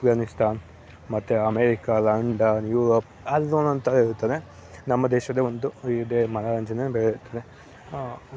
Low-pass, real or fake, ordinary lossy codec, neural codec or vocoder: none; real; none; none